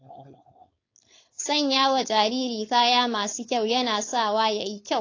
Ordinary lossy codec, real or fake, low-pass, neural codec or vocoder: AAC, 32 kbps; fake; 7.2 kHz; codec, 16 kHz, 4.8 kbps, FACodec